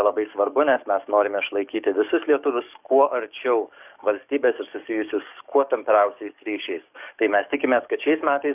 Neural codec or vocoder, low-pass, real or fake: codec, 44.1 kHz, 7.8 kbps, DAC; 3.6 kHz; fake